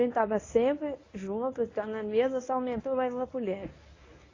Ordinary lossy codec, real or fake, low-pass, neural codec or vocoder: AAC, 32 kbps; fake; 7.2 kHz; codec, 24 kHz, 0.9 kbps, WavTokenizer, medium speech release version 1